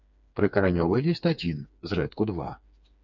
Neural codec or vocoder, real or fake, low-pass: codec, 16 kHz, 4 kbps, FreqCodec, smaller model; fake; 7.2 kHz